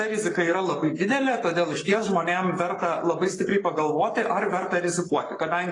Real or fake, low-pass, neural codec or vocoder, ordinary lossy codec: fake; 10.8 kHz; codec, 44.1 kHz, 7.8 kbps, Pupu-Codec; AAC, 32 kbps